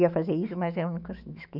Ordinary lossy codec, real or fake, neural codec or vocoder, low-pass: none; fake; autoencoder, 48 kHz, 128 numbers a frame, DAC-VAE, trained on Japanese speech; 5.4 kHz